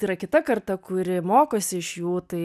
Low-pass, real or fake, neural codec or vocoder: 14.4 kHz; real; none